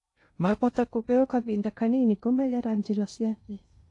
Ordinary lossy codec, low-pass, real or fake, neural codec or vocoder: AAC, 48 kbps; 10.8 kHz; fake; codec, 16 kHz in and 24 kHz out, 0.6 kbps, FocalCodec, streaming, 2048 codes